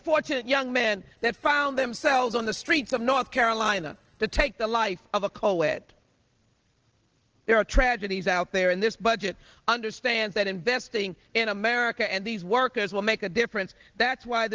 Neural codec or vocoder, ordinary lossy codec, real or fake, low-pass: none; Opus, 16 kbps; real; 7.2 kHz